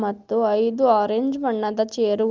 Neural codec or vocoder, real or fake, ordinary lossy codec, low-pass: none; real; Opus, 32 kbps; 7.2 kHz